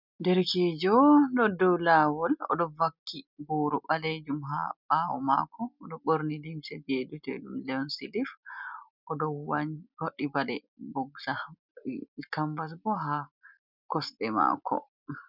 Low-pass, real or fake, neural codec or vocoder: 5.4 kHz; real; none